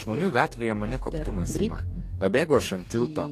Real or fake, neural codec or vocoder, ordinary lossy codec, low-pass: fake; codec, 44.1 kHz, 2.6 kbps, DAC; AAC, 64 kbps; 14.4 kHz